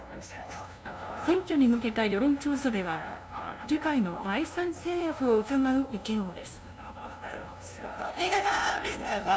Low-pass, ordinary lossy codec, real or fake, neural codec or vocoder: none; none; fake; codec, 16 kHz, 0.5 kbps, FunCodec, trained on LibriTTS, 25 frames a second